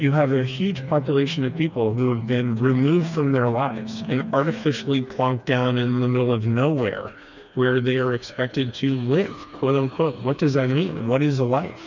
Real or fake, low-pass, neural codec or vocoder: fake; 7.2 kHz; codec, 16 kHz, 2 kbps, FreqCodec, smaller model